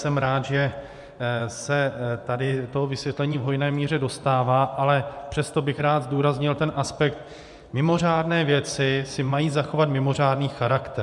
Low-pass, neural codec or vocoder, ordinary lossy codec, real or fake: 10.8 kHz; vocoder, 24 kHz, 100 mel bands, Vocos; MP3, 96 kbps; fake